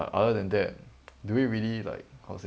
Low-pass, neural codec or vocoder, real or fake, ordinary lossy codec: none; none; real; none